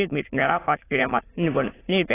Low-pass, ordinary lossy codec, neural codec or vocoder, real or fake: 3.6 kHz; AAC, 16 kbps; autoencoder, 22.05 kHz, a latent of 192 numbers a frame, VITS, trained on many speakers; fake